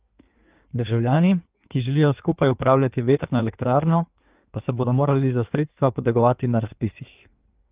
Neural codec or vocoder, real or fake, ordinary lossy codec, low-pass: codec, 16 kHz in and 24 kHz out, 1.1 kbps, FireRedTTS-2 codec; fake; Opus, 24 kbps; 3.6 kHz